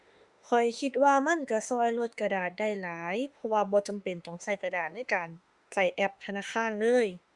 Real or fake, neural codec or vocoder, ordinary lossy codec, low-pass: fake; autoencoder, 48 kHz, 32 numbers a frame, DAC-VAE, trained on Japanese speech; Opus, 64 kbps; 10.8 kHz